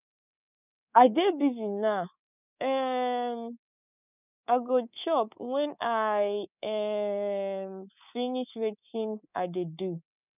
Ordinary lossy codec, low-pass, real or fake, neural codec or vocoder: none; 3.6 kHz; fake; codec, 24 kHz, 3.1 kbps, DualCodec